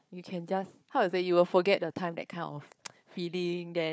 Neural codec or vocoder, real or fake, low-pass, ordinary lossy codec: codec, 16 kHz, 4 kbps, FunCodec, trained on Chinese and English, 50 frames a second; fake; none; none